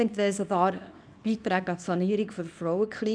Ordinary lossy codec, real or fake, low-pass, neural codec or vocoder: none; fake; 9.9 kHz; codec, 24 kHz, 0.9 kbps, WavTokenizer, small release